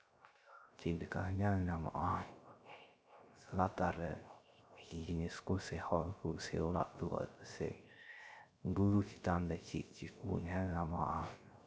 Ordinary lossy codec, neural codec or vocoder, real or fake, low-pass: none; codec, 16 kHz, 0.3 kbps, FocalCodec; fake; none